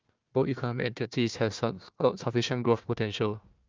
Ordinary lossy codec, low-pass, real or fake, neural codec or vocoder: Opus, 32 kbps; 7.2 kHz; fake; codec, 16 kHz, 1 kbps, FunCodec, trained on Chinese and English, 50 frames a second